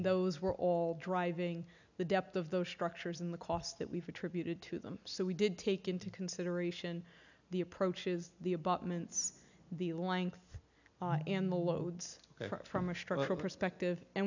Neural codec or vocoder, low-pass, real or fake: none; 7.2 kHz; real